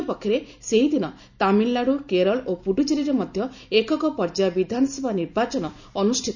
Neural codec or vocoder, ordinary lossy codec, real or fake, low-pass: none; none; real; 7.2 kHz